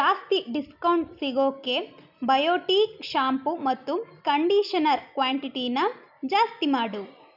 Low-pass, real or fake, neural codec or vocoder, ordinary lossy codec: 5.4 kHz; real; none; none